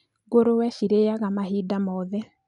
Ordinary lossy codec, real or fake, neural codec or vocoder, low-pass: none; real; none; 10.8 kHz